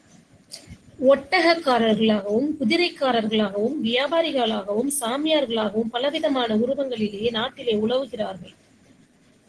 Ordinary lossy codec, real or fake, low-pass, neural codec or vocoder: Opus, 16 kbps; real; 10.8 kHz; none